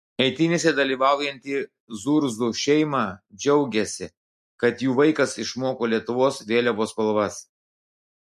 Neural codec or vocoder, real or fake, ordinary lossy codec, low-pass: none; real; MP3, 64 kbps; 14.4 kHz